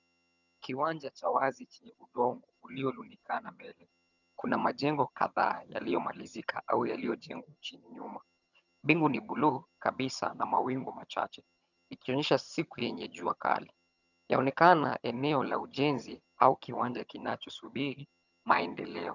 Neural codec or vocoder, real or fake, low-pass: vocoder, 22.05 kHz, 80 mel bands, HiFi-GAN; fake; 7.2 kHz